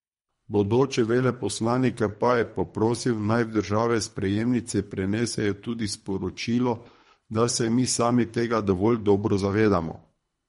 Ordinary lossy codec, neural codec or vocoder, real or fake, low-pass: MP3, 48 kbps; codec, 24 kHz, 3 kbps, HILCodec; fake; 10.8 kHz